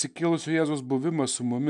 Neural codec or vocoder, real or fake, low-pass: none; real; 10.8 kHz